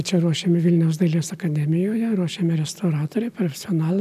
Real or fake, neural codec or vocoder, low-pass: real; none; 14.4 kHz